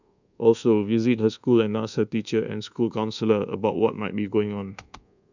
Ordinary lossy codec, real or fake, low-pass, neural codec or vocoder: none; fake; 7.2 kHz; codec, 24 kHz, 1.2 kbps, DualCodec